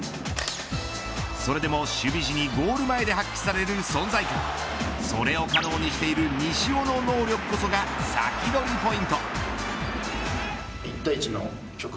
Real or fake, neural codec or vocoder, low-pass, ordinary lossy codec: real; none; none; none